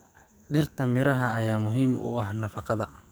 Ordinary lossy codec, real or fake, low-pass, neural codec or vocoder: none; fake; none; codec, 44.1 kHz, 2.6 kbps, SNAC